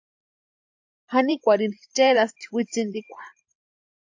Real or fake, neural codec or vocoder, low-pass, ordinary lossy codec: fake; vocoder, 44.1 kHz, 128 mel bands every 512 samples, BigVGAN v2; 7.2 kHz; AAC, 48 kbps